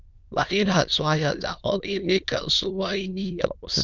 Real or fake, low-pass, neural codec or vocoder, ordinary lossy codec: fake; 7.2 kHz; autoencoder, 22.05 kHz, a latent of 192 numbers a frame, VITS, trained on many speakers; Opus, 32 kbps